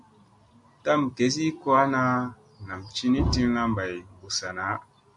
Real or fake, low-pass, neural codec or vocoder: real; 10.8 kHz; none